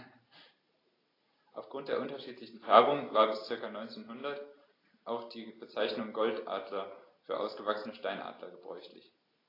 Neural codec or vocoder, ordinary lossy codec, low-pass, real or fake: none; AAC, 24 kbps; 5.4 kHz; real